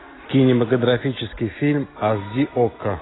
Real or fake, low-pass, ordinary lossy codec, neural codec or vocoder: real; 7.2 kHz; AAC, 16 kbps; none